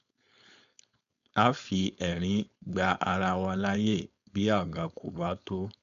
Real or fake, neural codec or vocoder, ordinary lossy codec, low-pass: fake; codec, 16 kHz, 4.8 kbps, FACodec; none; 7.2 kHz